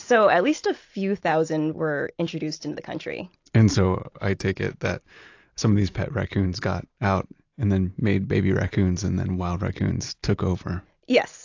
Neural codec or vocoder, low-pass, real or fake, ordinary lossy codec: none; 7.2 kHz; real; AAC, 48 kbps